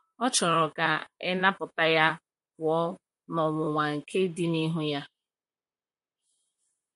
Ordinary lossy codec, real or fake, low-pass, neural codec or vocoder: MP3, 48 kbps; fake; 14.4 kHz; vocoder, 44.1 kHz, 128 mel bands, Pupu-Vocoder